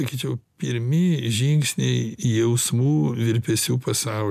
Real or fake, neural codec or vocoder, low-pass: real; none; 14.4 kHz